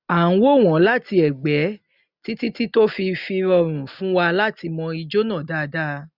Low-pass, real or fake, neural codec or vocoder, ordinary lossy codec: 5.4 kHz; real; none; none